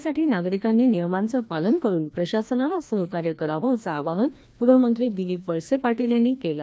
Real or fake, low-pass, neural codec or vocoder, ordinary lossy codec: fake; none; codec, 16 kHz, 1 kbps, FreqCodec, larger model; none